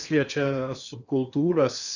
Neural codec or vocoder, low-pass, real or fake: codec, 16 kHz in and 24 kHz out, 0.8 kbps, FocalCodec, streaming, 65536 codes; 7.2 kHz; fake